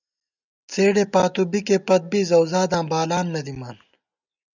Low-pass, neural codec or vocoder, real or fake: 7.2 kHz; none; real